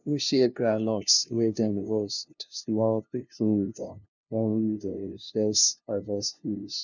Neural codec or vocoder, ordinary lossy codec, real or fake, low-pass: codec, 16 kHz, 0.5 kbps, FunCodec, trained on LibriTTS, 25 frames a second; none; fake; 7.2 kHz